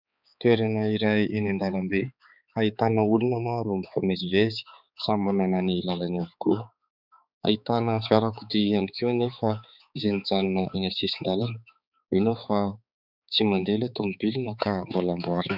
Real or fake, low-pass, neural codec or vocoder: fake; 5.4 kHz; codec, 16 kHz, 4 kbps, X-Codec, HuBERT features, trained on balanced general audio